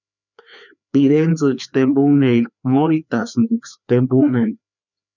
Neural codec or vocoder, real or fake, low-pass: codec, 16 kHz, 2 kbps, FreqCodec, larger model; fake; 7.2 kHz